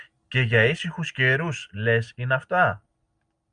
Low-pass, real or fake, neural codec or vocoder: 9.9 kHz; real; none